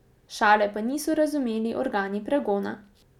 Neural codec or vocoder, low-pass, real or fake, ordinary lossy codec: none; 19.8 kHz; real; none